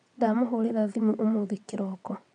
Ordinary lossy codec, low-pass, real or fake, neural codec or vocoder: none; 9.9 kHz; fake; vocoder, 22.05 kHz, 80 mel bands, WaveNeXt